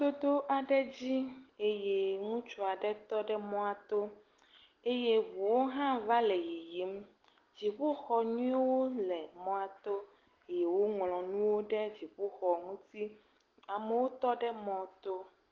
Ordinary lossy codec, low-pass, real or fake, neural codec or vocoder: Opus, 16 kbps; 7.2 kHz; real; none